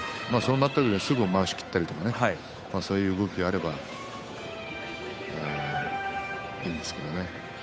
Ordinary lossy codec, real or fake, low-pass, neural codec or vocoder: none; fake; none; codec, 16 kHz, 8 kbps, FunCodec, trained on Chinese and English, 25 frames a second